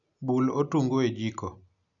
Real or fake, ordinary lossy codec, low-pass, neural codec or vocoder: real; none; 7.2 kHz; none